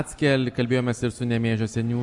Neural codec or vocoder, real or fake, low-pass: none; real; 10.8 kHz